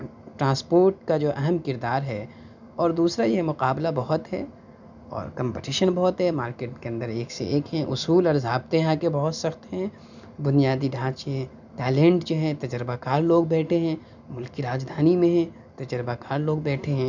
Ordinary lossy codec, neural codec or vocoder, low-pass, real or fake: none; none; 7.2 kHz; real